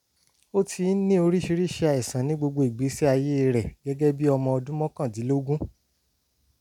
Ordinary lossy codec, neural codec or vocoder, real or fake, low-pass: none; none; real; 19.8 kHz